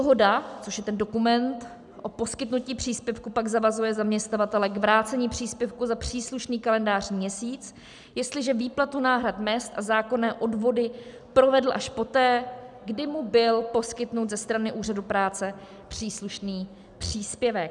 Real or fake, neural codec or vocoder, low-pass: real; none; 10.8 kHz